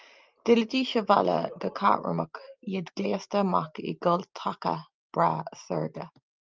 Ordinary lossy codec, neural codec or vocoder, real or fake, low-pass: Opus, 32 kbps; none; real; 7.2 kHz